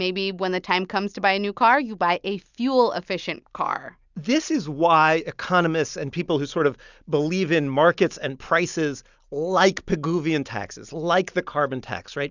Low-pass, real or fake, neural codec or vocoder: 7.2 kHz; real; none